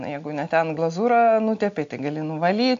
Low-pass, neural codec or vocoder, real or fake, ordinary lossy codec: 7.2 kHz; none; real; AAC, 64 kbps